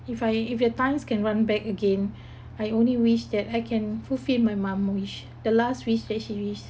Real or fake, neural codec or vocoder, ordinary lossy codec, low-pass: real; none; none; none